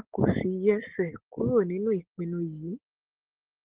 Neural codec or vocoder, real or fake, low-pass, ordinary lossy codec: none; real; 3.6 kHz; Opus, 16 kbps